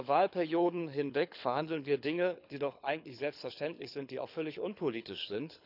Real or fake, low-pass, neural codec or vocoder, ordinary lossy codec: fake; 5.4 kHz; codec, 24 kHz, 6 kbps, HILCodec; none